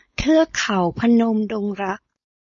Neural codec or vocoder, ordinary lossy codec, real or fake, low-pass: codec, 16 kHz, 4.8 kbps, FACodec; MP3, 32 kbps; fake; 7.2 kHz